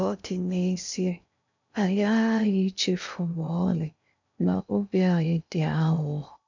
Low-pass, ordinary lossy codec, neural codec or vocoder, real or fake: 7.2 kHz; none; codec, 16 kHz in and 24 kHz out, 0.6 kbps, FocalCodec, streaming, 2048 codes; fake